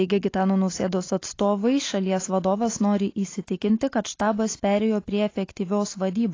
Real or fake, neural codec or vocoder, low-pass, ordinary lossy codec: real; none; 7.2 kHz; AAC, 32 kbps